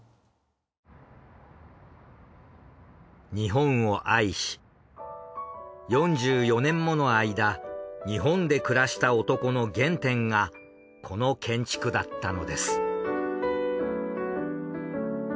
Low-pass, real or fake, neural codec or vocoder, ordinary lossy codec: none; real; none; none